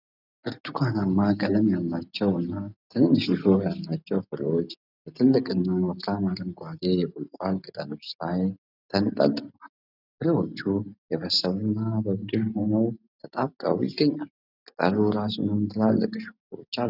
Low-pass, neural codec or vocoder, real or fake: 5.4 kHz; none; real